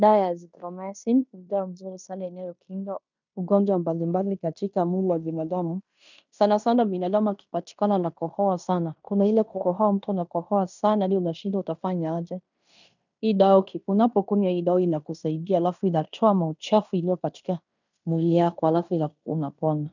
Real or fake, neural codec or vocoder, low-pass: fake; codec, 16 kHz in and 24 kHz out, 0.9 kbps, LongCat-Audio-Codec, fine tuned four codebook decoder; 7.2 kHz